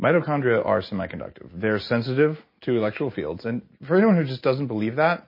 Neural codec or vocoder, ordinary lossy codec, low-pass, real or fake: none; MP3, 24 kbps; 5.4 kHz; real